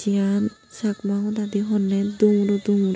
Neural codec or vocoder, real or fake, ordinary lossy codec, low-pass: none; real; none; none